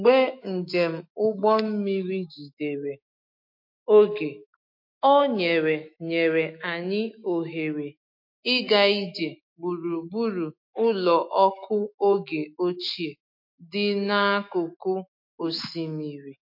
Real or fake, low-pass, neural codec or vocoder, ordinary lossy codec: fake; 5.4 kHz; autoencoder, 48 kHz, 128 numbers a frame, DAC-VAE, trained on Japanese speech; MP3, 32 kbps